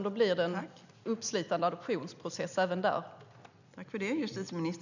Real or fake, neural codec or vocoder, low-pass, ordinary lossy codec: real; none; 7.2 kHz; none